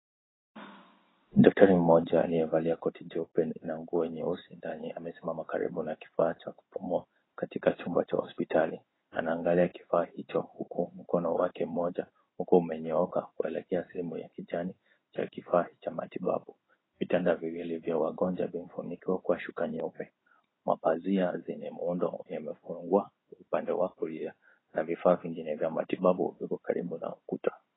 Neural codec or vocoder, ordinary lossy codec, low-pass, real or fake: codec, 16 kHz in and 24 kHz out, 1 kbps, XY-Tokenizer; AAC, 16 kbps; 7.2 kHz; fake